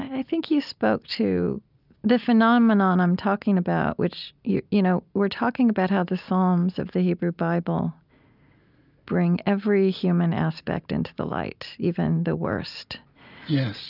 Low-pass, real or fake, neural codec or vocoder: 5.4 kHz; real; none